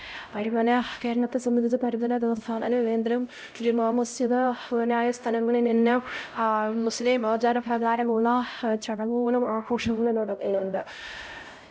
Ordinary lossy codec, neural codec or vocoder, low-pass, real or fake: none; codec, 16 kHz, 0.5 kbps, X-Codec, HuBERT features, trained on LibriSpeech; none; fake